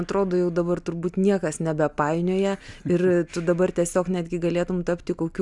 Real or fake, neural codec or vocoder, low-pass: real; none; 10.8 kHz